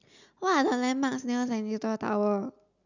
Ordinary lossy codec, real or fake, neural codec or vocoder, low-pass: none; real; none; 7.2 kHz